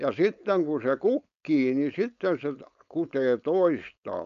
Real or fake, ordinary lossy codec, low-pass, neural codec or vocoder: fake; none; 7.2 kHz; codec, 16 kHz, 4.8 kbps, FACodec